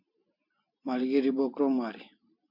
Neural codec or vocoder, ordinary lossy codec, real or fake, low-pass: vocoder, 44.1 kHz, 128 mel bands every 256 samples, BigVGAN v2; MP3, 48 kbps; fake; 5.4 kHz